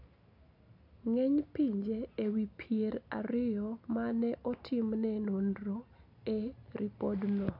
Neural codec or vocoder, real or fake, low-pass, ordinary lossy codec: none; real; 5.4 kHz; none